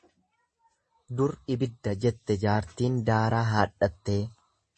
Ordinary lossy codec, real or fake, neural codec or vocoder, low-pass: MP3, 32 kbps; real; none; 10.8 kHz